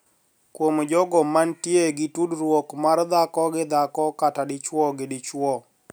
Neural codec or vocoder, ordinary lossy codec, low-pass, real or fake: none; none; none; real